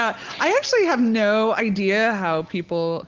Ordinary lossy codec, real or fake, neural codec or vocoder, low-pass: Opus, 16 kbps; real; none; 7.2 kHz